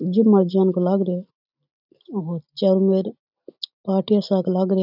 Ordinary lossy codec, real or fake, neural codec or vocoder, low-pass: none; real; none; 5.4 kHz